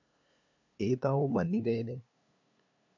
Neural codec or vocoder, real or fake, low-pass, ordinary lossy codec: codec, 16 kHz, 2 kbps, FunCodec, trained on LibriTTS, 25 frames a second; fake; 7.2 kHz; AAC, 48 kbps